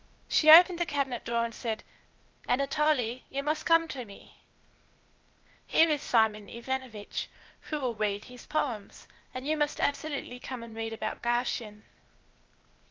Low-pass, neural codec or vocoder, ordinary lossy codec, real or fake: 7.2 kHz; codec, 16 kHz, 0.8 kbps, ZipCodec; Opus, 24 kbps; fake